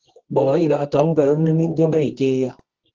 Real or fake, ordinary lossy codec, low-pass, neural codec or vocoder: fake; Opus, 16 kbps; 7.2 kHz; codec, 24 kHz, 0.9 kbps, WavTokenizer, medium music audio release